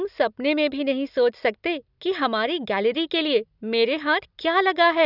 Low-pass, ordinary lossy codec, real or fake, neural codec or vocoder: 5.4 kHz; none; real; none